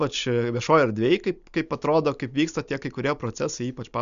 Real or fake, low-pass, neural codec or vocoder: real; 7.2 kHz; none